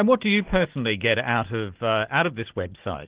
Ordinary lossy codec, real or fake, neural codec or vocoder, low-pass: Opus, 16 kbps; real; none; 3.6 kHz